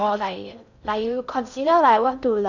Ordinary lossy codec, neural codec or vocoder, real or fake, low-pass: none; codec, 16 kHz in and 24 kHz out, 0.6 kbps, FocalCodec, streaming, 4096 codes; fake; 7.2 kHz